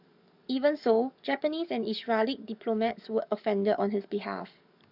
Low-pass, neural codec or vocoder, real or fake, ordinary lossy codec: 5.4 kHz; codec, 44.1 kHz, 7.8 kbps, DAC; fake; none